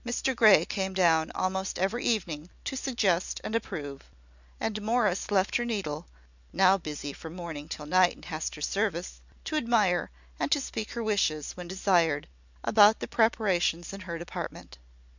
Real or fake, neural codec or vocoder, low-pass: real; none; 7.2 kHz